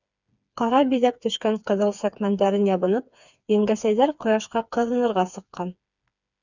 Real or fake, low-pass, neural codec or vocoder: fake; 7.2 kHz; codec, 16 kHz, 4 kbps, FreqCodec, smaller model